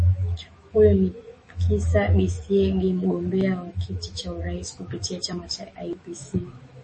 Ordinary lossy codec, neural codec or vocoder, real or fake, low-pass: MP3, 32 kbps; autoencoder, 48 kHz, 128 numbers a frame, DAC-VAE, trained on Japanese speech; fake; 10.8 kHz